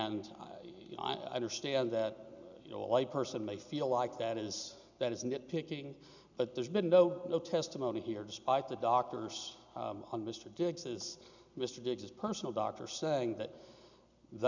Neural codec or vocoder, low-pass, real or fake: none; 7.2 kHz; real